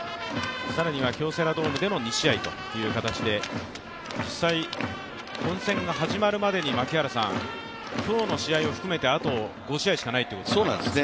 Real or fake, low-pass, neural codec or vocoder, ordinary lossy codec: real; none; none; none